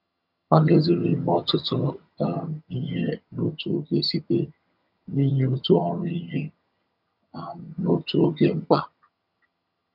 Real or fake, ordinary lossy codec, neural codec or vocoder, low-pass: fake; none; vocoder, 22.05 kHz, 80 mel bands, HiFi-GAN; 5.4 kHz